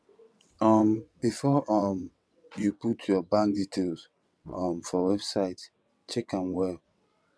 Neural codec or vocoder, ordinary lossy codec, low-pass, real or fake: vocoder, 22.05 kHz, 80 mel bands, WaveNeXt; none; none; fake